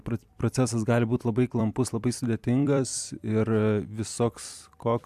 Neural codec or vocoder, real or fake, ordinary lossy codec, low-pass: vocoder, 44.1 kHz, 128 mel bands every 256 samples, BigVGAN v2; fake; AAC, 96 kbps; 14.4 kHz